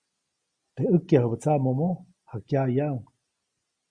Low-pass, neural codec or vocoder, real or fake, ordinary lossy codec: 9.9 kHz; none; real; Opus, 64 kbps